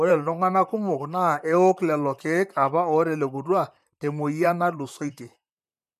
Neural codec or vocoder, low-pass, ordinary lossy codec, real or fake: vocoder, 44.1 kHz, 128 mel bands, Pupu-Vocoder; 14.4 kHz; MP3, 96 kbps; fake